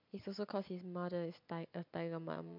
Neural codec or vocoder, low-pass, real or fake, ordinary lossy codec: none; 5.4 kHz; real; MP3, 48 kbps